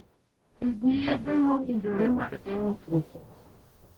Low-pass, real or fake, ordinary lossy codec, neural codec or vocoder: 19.8 kHz; fake; Opus, 16 kbps; codec, 44.1 kHz, 0.9 kbps, DAC